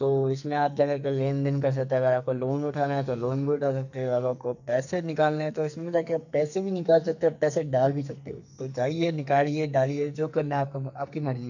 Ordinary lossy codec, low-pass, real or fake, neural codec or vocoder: none; 7.2 kHz; fake; codec, 44.1 kHz, 2.6 kbps, SNAC